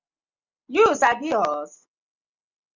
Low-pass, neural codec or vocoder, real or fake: 7.2 kHz; none; real